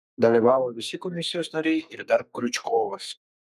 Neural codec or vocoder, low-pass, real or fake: codec, 32 kHz, 1.9 kbps, SNAC; 14.4 kHz; fake